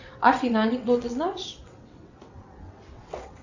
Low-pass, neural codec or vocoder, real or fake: 7.2 kHz; vocoder, 22.05 kHz, 80 mel bands, WaveNeXt; fake